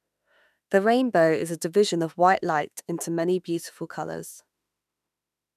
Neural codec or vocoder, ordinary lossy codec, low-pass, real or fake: autoencoder, 48 kHz, 32 numbers a frame, DAC-VAE, trained on Japanese speech; none; 14.4 kHz; fake